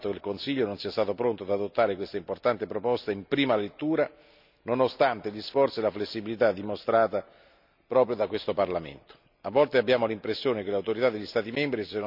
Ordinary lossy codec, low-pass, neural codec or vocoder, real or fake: none; 5.4 kHz; none; real